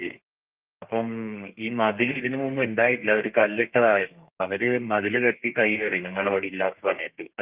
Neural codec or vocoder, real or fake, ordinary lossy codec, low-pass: codec, 32 kHz, 1.9 kbps, SNAC; fake; Opus, 24 kbps; 3.6 kHz